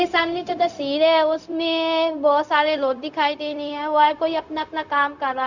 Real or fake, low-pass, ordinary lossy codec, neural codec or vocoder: fake; 7.2 kHz; none; codec, 16 kHz, 0.4 kbps, LongCat-Audio-Codec